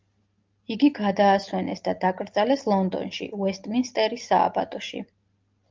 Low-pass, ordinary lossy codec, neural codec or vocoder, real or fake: 7.2 kHz; Opus, 24 kbps; none; real